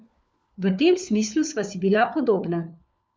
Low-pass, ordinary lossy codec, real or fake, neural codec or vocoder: none; none; fake; codec, 16 kHz, 4 kbps, FunCodec, trained on LibriTTS, 50 frames a second